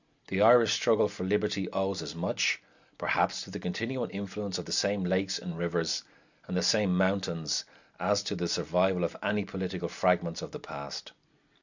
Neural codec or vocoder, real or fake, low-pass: none; real; 7.2 kHz